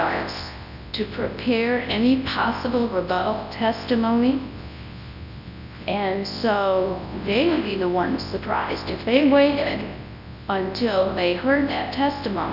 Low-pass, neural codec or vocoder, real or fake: 5.4 kHz; codec, 24 kHz, 0.9 kbps, WavTokenizer, large speech release; fake